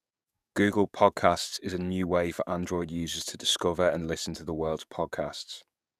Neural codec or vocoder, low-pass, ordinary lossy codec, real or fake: codec, 44.1 kHz, 7.8 kbps, DAC; 14.4 kHz; none; fake